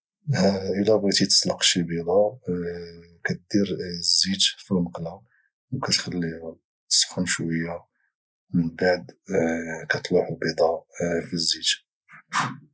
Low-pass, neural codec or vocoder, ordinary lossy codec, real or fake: none; none; none; real